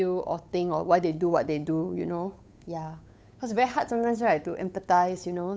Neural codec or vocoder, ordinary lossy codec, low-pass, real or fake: codec, 16 kHz, 8 kbps, FunCodec, trained on Chinese and English, 25 frames a second; none; none; fake